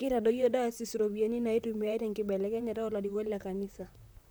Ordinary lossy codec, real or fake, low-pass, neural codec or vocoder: none; fake; none; vocoder, 44.1 kHz, 128 mel bands, Pupu-Vocoder